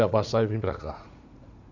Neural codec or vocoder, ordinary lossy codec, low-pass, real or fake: vocoder, 44.1 kHz, 80 mel bands, Vocos; none; 7.2 kHz; fake